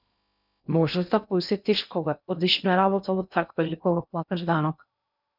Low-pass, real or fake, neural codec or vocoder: 5.4 kHz; fake; codec, 16 kHz in and 24 kHz out, 0.6 kbps, FocalCodec, streaming, 2048 codes